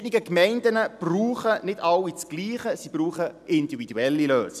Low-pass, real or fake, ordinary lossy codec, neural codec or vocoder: 14.4 kHz; real; none; none